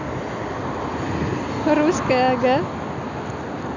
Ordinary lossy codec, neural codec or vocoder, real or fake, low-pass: none; none; real; 7.2 kHz